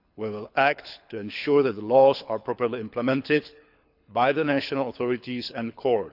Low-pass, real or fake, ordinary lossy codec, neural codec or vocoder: 5.4 kHz; fake; none; codec, 24 kHz, 6 kbps, HILCodec